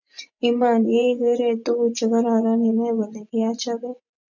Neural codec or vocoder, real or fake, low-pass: vocoder, 24 kHz, 100 mel bands, Vocos; fake; 7.2 kHz